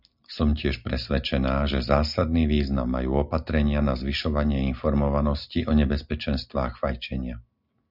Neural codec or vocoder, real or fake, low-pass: none; real; 5.4 kHz